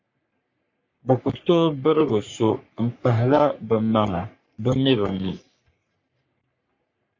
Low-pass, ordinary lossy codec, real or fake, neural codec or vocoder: 7.2 kHz; MP3, 48 kbps; fake; codec, 44.1 kHz, 3.4 kbps, Pupu-Codec